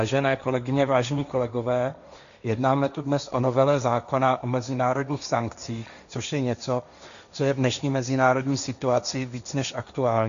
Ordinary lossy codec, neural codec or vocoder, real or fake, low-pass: MP3, 96 kbps; codec, 16 kHz, 1.1 kbps, Voila-Tokenizer; fake; 7.2 kHz